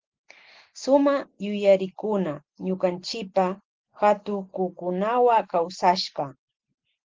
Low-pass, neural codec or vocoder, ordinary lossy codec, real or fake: 7.2 kHz; none; Opus, 16 kbps; real